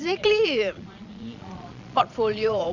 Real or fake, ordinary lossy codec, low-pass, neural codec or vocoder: fake; none; 7.2 kHz; vocoder, 22.05 kHz, 80 mel bands, Vocos